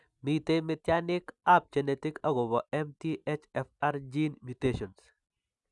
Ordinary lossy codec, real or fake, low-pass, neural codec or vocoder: none; real; 10.8 kHz; none